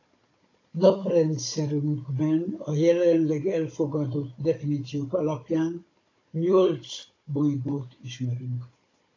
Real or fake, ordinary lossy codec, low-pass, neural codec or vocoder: fake; AAC, 32 kbps; 7.2 kHz; codec, 16 kHz, 16 kbps, FunCodec, trained on Chinese and English, 50 frames a second